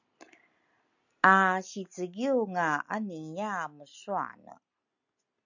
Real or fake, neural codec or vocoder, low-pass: real; none; 7.2 kHz